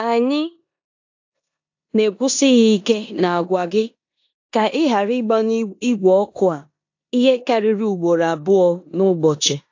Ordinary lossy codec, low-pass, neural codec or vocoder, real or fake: AAC, 48 kbps; 7.2 kHz; codec, 16 kHz in and 24 kHz out, 0.9 kbps, LongCat-Audio-Codec, four codebook decoder; fake